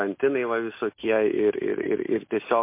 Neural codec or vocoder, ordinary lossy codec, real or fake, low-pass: none; MP3, 24 kbps; real; 3.6 kHz